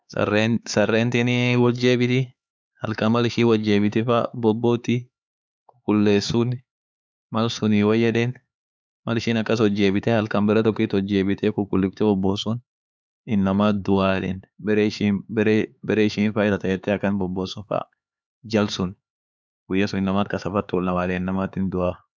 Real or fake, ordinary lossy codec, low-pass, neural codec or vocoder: fake; none; none; codec, 16 kHz, 4 kbps, X-Codec, HuBERT features, trained on LibriSpeech